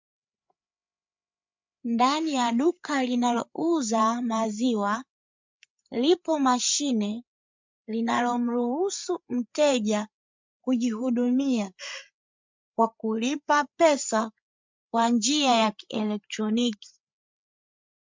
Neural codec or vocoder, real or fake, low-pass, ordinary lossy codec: codec, 16 kHz, 8 kbps, FreqCodec, larger model; fake; 7.2 kHz; MP3, 64 kbps